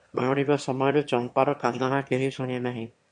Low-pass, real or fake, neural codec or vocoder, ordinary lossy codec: 9.9 kHz; fake; autoencoder, 22.05 kHz, a latent of 192 numbers a frame, VITS, trained on one speaker; MP3, 64 kbps